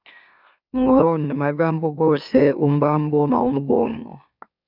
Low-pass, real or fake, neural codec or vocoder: 5.4 kHz; fake; autoencoder, 44.1 kHz, a latent of 192 numbers a frame, MeloTTS